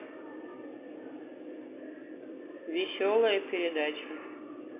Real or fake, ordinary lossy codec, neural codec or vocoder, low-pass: real; none; none; 3.6 kHz